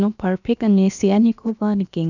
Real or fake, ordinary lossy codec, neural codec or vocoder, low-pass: fake; none; codec, 16 kHz, about 1 kbps, DyCAST, with the encoder's durations; 7.2 kHz